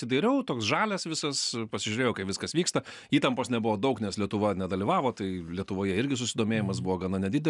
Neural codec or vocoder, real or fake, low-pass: none; real; 10.8 kHz